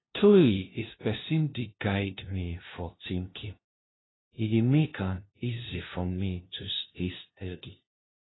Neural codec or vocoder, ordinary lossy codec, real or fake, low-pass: codec, 16 kHz, 0.5 kbps, FunCodec, trained on LibriTTS, 25 frames a second; AAC, 16 kbps; fake; 7.2 kHz